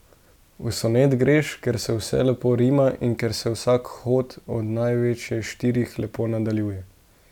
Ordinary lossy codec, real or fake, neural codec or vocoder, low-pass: none; real; none; 19.8 kHz